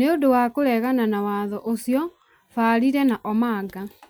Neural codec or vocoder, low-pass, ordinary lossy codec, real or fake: none; none; none; real